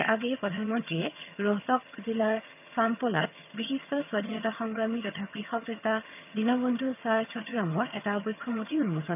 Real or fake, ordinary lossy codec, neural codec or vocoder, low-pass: fake; none; vocoder, 22.05 kHz, 80 mel bands, HiFi-GAN; 3.6 kHz